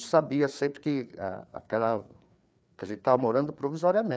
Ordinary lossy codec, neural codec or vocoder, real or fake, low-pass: none; codec, 16 kHz, 4 kbps, FreqCodec, larger model; fake; none